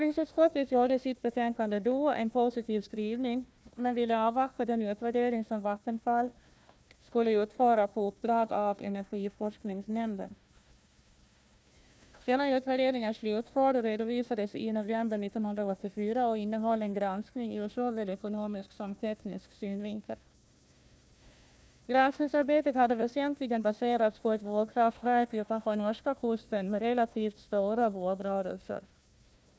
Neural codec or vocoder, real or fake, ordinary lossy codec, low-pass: codec, 16 kHz, 1 kbps, FunCodec, trained on Chinese and English, 50 frames a second; fake; none; none